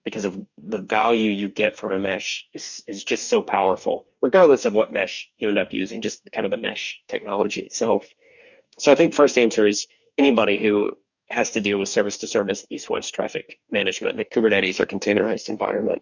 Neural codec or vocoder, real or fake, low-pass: codec, 44.1 kHz, 2.6 kbps, DAC; fake; 7.2 kHz